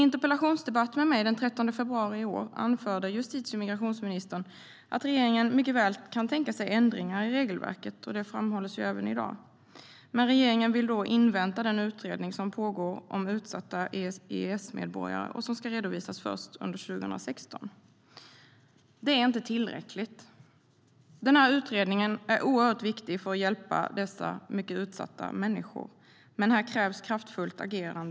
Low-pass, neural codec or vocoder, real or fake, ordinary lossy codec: none; none; real; none